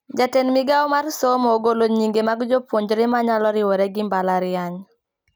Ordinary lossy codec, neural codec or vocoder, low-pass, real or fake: none; none; none; real